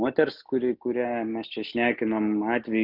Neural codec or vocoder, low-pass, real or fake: none; 5.4 kHz; real